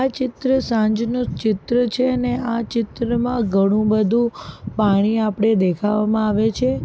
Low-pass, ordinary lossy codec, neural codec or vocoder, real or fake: none; none; none; real